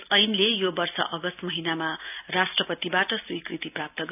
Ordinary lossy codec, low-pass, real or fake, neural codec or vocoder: AAC, 32 kbps; 3.6 kHz; real; none